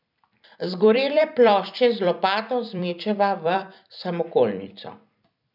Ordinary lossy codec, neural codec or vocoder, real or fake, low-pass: none; vocoder, 44.1 kHz, 128 mel bands every 256 samples, BigVGAN v2; fake; 5.4 kHz